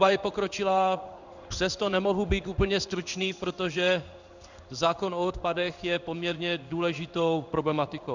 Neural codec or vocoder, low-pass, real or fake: codec, 16 kHz in and 24 kHz out, 1 kbps, XY-Tokenizer; 7.2 kHz; fake